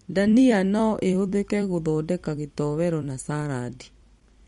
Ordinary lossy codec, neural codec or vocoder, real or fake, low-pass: MP3, 48 kbps; vocoder, 44.1 kHz, 128 mel bands every 256 samples, BigVGAN v2; fake; 19.8 kHz